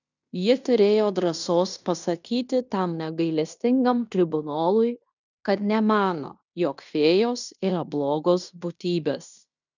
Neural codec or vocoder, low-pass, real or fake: codec, 16 kHz in and 24 kHz out, 0.9 kbps, LongCat-Audio-Codec, fine tuned four codebook decoder; 7.2 kHz; fake